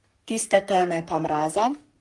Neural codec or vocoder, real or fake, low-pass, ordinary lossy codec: codec, 44.1 kHz, 3.4 kbps, Pupu-Codec; fake; 10.8 kHz; Opus, 24 kbps